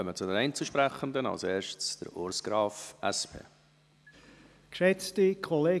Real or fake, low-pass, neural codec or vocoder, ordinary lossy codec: real; none; none; none